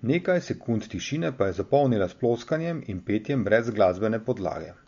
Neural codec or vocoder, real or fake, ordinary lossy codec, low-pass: none; real; MP3, 48 kbps; 7.2 kHz